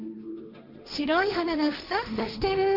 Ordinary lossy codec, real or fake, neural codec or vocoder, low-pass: none; fake; codec, 16 kHz, 1.1 kbps, Voila-Tokenizer; 5.4 kHz